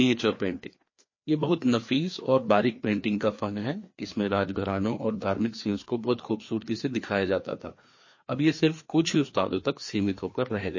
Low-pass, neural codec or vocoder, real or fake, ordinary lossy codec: 7.2 kHz; codec, 16 kHz, 2 kbps, FreqCodec, larger model; fake; MP3, 32 kbps